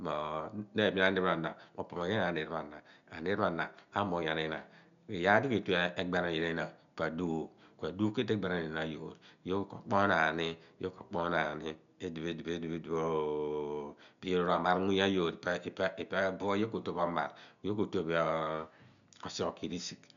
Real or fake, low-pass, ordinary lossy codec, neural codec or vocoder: real; 7.2 kHz; none; none